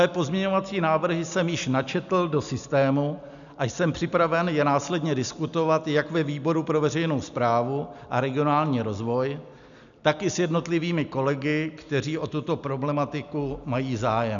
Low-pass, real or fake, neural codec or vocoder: 7.2 kHz; real; none